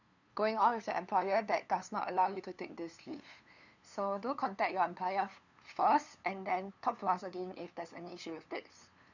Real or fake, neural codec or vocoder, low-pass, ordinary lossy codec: fake; codec, 16 kHz, 2 kbps, FunCodec, trained on LibriTTS, 25 frames a second; 7.2 kHz; none